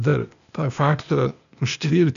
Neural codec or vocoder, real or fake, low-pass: codec, 16 kHz, 0.8 kbps, ZipCodec; fake; 7.2 kHz